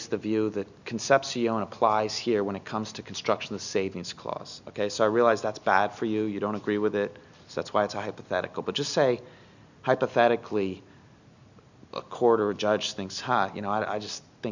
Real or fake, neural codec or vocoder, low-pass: real; none; 7.2 kHz